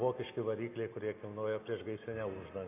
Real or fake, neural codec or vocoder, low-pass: real; none; 3.6 kHz